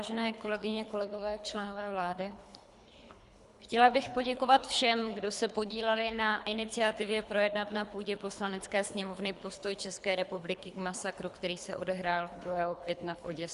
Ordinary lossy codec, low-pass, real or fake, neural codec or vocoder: Opus, 64 kbps; 10.8 kHz; fake; codec, 24 kHz, 3 kbps, HILCodec